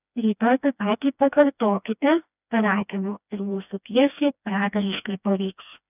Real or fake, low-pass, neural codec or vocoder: fake; 3.6 kHz; codec, 16 kHz, 1 kbps, FreqCodec, smaller model